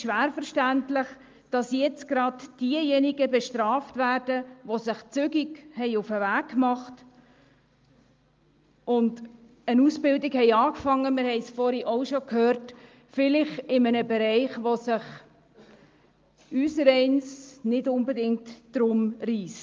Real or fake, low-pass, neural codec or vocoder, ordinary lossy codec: real; 7.2 kHz; none; Opus, 32 kbps